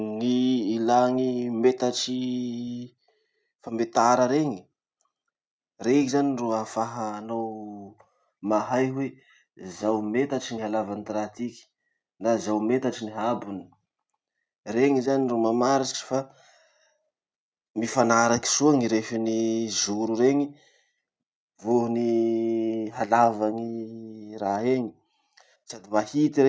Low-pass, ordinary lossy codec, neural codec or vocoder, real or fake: none; none; none; real